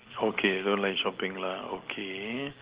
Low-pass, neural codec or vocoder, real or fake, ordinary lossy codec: 3.6 kHz; none; real; Opus, 16 kbps